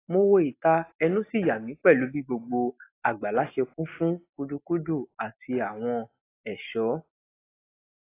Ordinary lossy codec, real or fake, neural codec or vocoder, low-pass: AAC, 24 kbps; real; none; 3.6 kHz